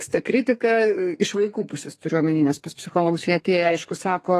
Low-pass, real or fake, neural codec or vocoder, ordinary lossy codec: 14.4 kHz; fake; codec, 32 kHz, 1.9 kbps, SNAC; AAC, 48 kbps